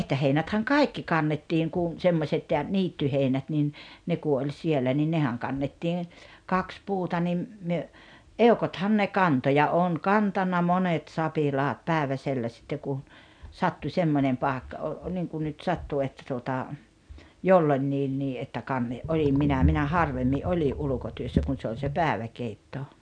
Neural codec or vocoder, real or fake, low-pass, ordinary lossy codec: none; real; 9.9 kHz; none